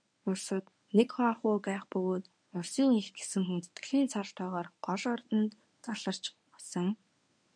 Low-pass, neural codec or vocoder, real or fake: 9.9 kHz; codec, 24 kHz, 0.9 kbps, WavTokenizer, medium speech release version 1; fake